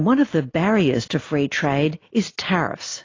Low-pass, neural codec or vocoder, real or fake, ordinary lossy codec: 7.2 kHz; none; real; AAC, 32 kbps